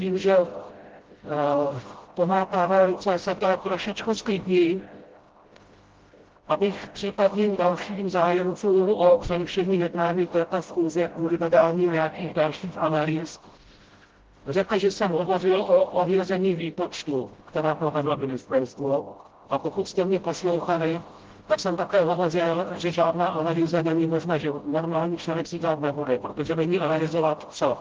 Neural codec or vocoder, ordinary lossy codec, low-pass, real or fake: codec, 16 kHz, 0.5 kbps, FreqCodec, smaller model; Opus, 16 kbps; 7.2 kHz; fake